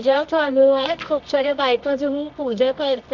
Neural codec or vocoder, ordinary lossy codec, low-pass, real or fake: codec, 24 kHz, 0.9 kbps, WavTokenizer, medium music audio release; none; 7.2 kHz; fake